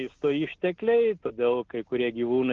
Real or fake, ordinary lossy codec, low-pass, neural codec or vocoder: real; Opus, 16 kbps; 7.2 kHz; none